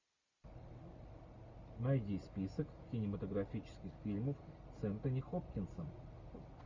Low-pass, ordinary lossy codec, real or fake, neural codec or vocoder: 7.2 kHz; MP3, 64 kbps; real; none